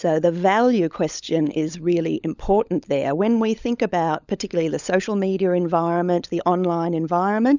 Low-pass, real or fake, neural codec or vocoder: 7.2 kHz; fake; codec, 16 kHz, 16 kbps, FunCodec, trained on LibriTTS, 50 frames a second